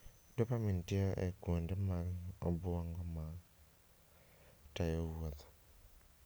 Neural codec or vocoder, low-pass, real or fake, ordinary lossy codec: none; none; real; none